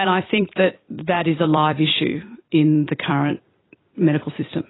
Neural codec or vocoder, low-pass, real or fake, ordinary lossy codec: vocoder, 44.1 kHz, 128 mel bands every 256 samples, BigVGAN v2; 7.2 kHz; fake; AAC, 16 kbps